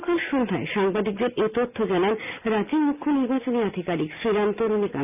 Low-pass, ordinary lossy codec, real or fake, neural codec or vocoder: 3.6 kHz; none; real; none